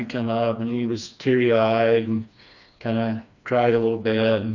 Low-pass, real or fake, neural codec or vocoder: 7.2 kHz; fake; codec, 16 kHz, 2 kbps, FreqCodec, smaller model